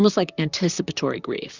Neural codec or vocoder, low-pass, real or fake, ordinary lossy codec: vocoder, 44.1 kHz, 128 mel bands, Pupu-Vocoder; 7.2 kHz; fake; Opus, 64 kbps